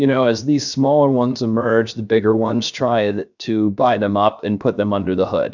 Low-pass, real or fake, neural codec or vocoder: 7.2 kHz; fake; codec, 16 kHz, 0.7 kbps, FocalCodec